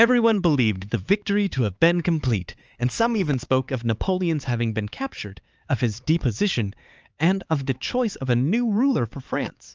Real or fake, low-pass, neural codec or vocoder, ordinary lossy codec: fake; 7.2 kHz; codec, 16 kHz, 4 kbps, X-Codec, HuBERT features, trained on LibriSpeech; Opus, 24 kbps